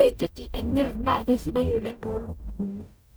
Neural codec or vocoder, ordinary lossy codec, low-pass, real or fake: codec, 44.1 kHz, 0.9 kbps, DAC; none; none; fake